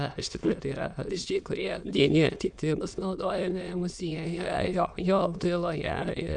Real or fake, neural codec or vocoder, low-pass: fake; autoencoder, 22.05 kHz, a latent of 192 numbers a frame, VITS, trained on many speakers; 9.9 kHz